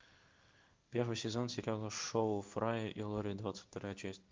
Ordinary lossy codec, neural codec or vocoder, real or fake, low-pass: Opus, 32 kbps; codec, 16 kHz in and 24 kHz out, 1 kbps, XY-Tokenizer; fake; 7.2 kHz